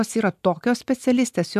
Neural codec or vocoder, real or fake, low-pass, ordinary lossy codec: none; real; 14.4 kHz; MP3, 96 kbps